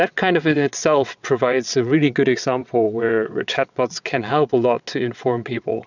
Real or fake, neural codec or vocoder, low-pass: fake; vocoder, 22.05 kHz, 80 mel bands, WaveNeXt; 7.2 kHz